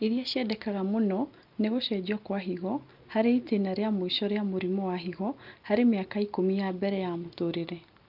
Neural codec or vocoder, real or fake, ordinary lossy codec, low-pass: none; real; Opus, 24 kbps; 5.4 kHz